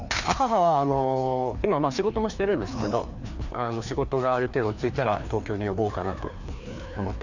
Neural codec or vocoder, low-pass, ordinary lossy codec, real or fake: codec, 16 kHz, 2 kbps, FreqCodec, larger model; 7.2 kHz; none; fake